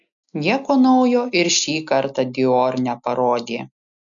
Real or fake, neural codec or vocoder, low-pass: real; none; 7.2 kHz